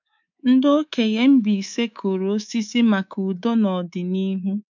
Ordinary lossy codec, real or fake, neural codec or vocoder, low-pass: none; fake; codec, 24 kHz, 3.1 kbps, DualCodec; 7.2 kHz